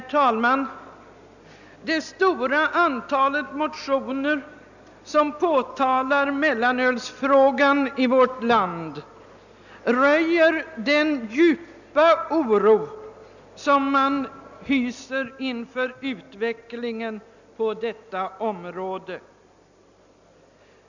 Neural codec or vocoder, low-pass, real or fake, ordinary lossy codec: none; 7.2 kHz; real; none